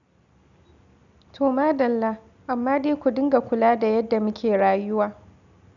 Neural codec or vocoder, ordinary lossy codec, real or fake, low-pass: none; none; real; 7.2 kHz